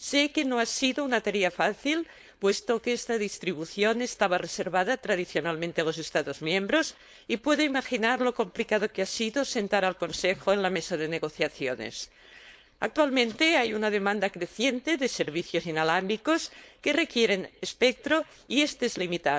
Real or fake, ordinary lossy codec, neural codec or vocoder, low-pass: fake; none; codec, 16 kHz, 4.8 kbps, FACodec; none